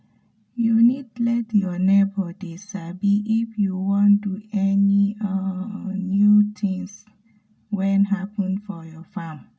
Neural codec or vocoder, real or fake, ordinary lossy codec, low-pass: none; real; none; none